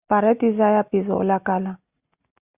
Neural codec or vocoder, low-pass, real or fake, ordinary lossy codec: none; 3.6 kHz; real; Opus, 64 kbps